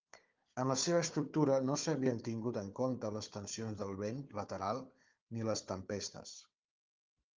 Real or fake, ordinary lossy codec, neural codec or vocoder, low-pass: fake; Opus, 24 kbps; codec, 16 kHz, 4 kbps, FunCodec, trained on Chinese and English, 50 frames a second; 7.2 kHz